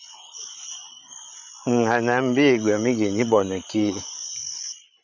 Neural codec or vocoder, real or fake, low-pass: codec, 16 kHz, 8 kbps, FreqCodec, larger model; fake; 7.2 kHz